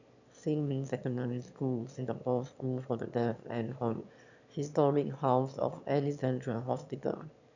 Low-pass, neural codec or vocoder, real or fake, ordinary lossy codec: 7.2 kHz; autoencoder, 22.05 kHz, a latent of 192 numbers a frame, VITS, trained on one speaker; fake; none